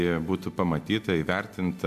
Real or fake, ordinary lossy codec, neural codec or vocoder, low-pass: real; AAC, 96 kbps; none; 14.4 kHz